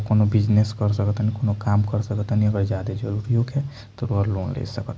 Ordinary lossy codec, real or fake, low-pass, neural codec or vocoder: none; real; none; none